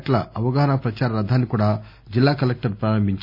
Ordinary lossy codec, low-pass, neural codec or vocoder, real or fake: none; 5.4 kHz; none; real